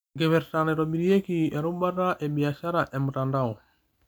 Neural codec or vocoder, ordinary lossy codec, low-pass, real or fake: none; none; none; real